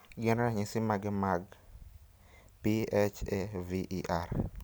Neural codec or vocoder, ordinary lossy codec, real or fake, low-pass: none; none; real; none